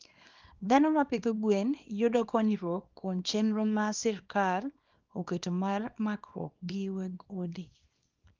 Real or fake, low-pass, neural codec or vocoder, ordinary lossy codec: fake; 7.2 kHz; codec, 24 kHz, 0.9 kbps, WavTokenizer, small release; Opus, 24 kbps